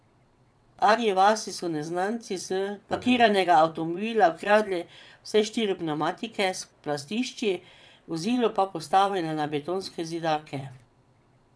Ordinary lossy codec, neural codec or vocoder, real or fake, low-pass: none; vocoder, 22.05 kHz, 80 mel bands, WaveNeXt; fake; none